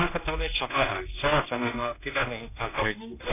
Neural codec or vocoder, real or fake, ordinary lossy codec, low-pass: codec, 16 kHz, 1 kbps, X-Codec, HuBERT features, trained on general audio; fake; none; 3.6 kHz